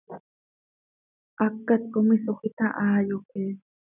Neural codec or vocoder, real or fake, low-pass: none; real; 3.6 kHz